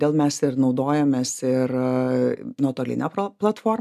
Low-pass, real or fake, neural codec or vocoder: 14.4 kHz; real; none